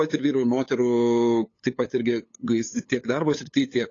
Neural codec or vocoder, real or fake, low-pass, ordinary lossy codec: codec, 16 kHz, 8 kbps, FunCodec, trained on LibriTTS, 25 frames a second; fake; 7.2 kHz; AAC, 32 kbps